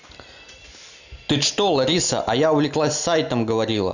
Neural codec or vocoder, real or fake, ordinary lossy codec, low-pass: none; real; none; 7.2 kHz